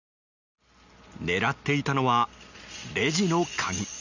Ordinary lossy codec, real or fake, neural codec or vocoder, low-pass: none; real; none; 7.2 kHz